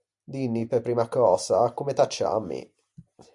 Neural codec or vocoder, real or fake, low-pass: none; real; 10.8 kHz